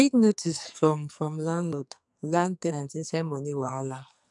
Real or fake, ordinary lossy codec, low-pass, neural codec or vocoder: fake; none; 10.8 kHz; codec, 44.1 kHz, 2.6 kbps, SNAC